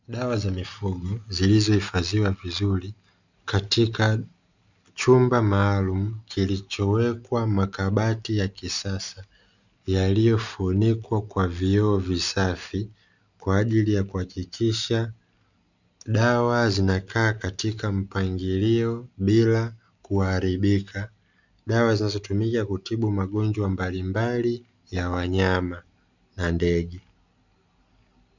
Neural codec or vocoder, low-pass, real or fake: none; 7.2 kHz; real